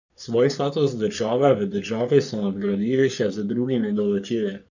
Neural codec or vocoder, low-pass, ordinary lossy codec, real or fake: codec, 44.1 kHz, 3.4 kbps, Pupu-Codec; 7.2 kHz; none; fake